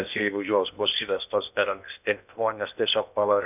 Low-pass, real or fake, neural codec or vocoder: 3.6 kHz; fake; codec, 16 kHz in and 24 kHz out, 0.8 kbps, FocalCodec, streaming, 65536 codes